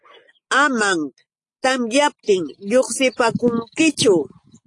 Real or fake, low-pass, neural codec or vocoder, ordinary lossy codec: real; 10.8 kHz; none; AAC, 48 kbps